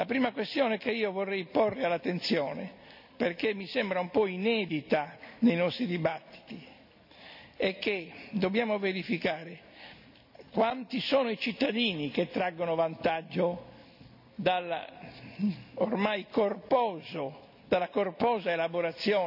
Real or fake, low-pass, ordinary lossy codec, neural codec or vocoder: real; 5.4 kHz; none; none